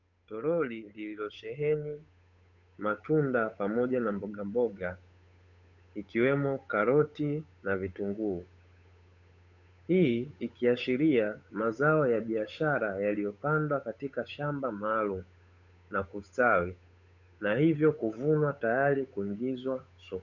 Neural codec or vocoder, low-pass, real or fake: codec, 16 kHz, 8 kbps, FunCodec, trained on Chinese and English, 25 frames a second; 7.2 kHz; fake